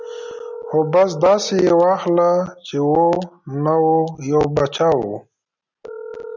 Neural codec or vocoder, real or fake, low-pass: none; real; 7.2 kHz